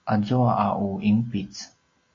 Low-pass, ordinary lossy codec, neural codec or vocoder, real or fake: 7.2 kHz; AAC, 32 kbps; none; real